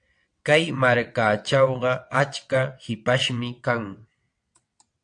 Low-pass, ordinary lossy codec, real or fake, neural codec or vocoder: 9.9 kHz; AAC, 64 kbps; fake; vocoder, 22.05 kHz, 80 mel bands, WaveNeXt